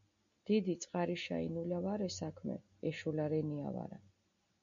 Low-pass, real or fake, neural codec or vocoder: 7.2 kHz; real; none